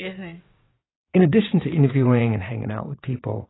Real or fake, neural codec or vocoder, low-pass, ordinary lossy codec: fake; vocoder, 22.05 kHz, 80 mel bands, WaveNeXt; 7.2 kHz; AAC, 16 kbps